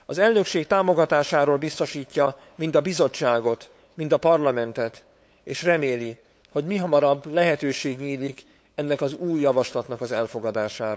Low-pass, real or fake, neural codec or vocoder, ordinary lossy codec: none; fake; codec, 16 kHz, 8 kbps, FunCodec, trained on LibriTTS, 25 frames a second; none